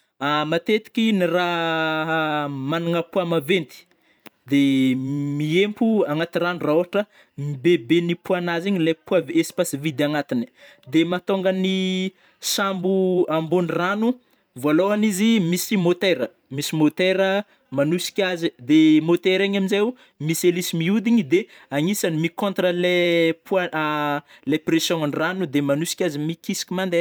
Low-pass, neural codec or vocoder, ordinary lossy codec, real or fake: none; vocoder, 44.1 kHz, 128 mel bands every 512 samples, BigVGAN v2; none; fake